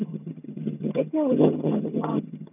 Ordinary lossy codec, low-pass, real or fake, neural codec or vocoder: none; 3.6 kHz; fake; vocoder, 22.05 kHz, 80 mel bands, HiFi-GAN